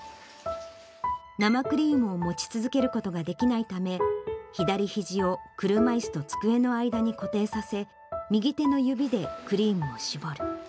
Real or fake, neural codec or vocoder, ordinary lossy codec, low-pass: real; none; none; none